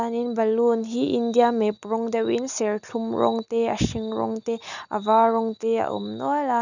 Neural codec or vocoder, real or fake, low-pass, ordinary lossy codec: none; real; 7.2 kHz; none